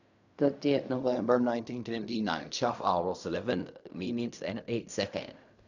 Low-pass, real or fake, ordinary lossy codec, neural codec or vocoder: 7.2 kHz; fake; none; codec, 16 kHz in and 24 kHz out, 0.4 kbps, LongCat-Audio-Codec, fine tuned four codebook decoder